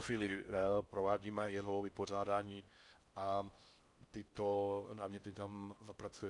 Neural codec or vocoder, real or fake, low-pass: codec, 16 kHz in and 24 kHz out, 0.6 kbps, FocalCodec, streaming, 4096 codes; fake; 10.8 kHz